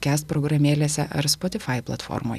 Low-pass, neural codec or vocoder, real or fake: 14.4 kHz; none; real